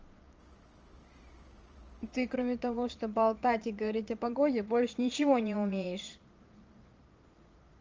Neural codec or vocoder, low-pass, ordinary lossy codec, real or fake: vocoder, 22.05 kHz, 80 mel bands, Vocos; 7.2 kHz; Opus, 24 kbps; fake